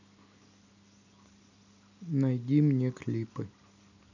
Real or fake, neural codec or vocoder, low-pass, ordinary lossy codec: real; none; 7.2 kHz; none